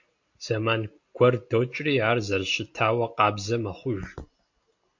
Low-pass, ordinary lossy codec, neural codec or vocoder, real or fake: 7.2 kHz; MP3, 48 kbps; none; real